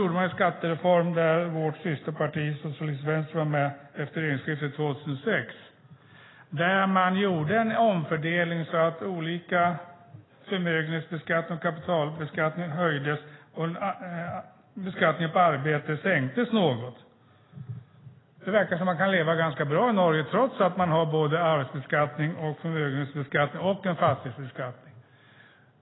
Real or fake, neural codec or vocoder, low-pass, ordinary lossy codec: real; none; 7.2 kHz; AAC, 16 kbps